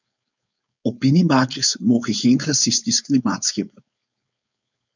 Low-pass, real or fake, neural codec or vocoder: 7.2 kHz; fake; codec, 16 kHz, 4.8 kbps, FACodec